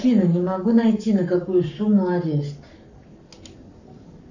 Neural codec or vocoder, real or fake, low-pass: codec, 44.1 kHz, 7.8 kbps, Pupu-Codec; fake; 7.2 kHz